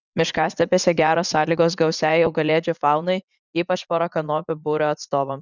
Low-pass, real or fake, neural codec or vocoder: 7.2 kHz; fake; vocoder, 44.1 kHz, 80 mel bands, Vocos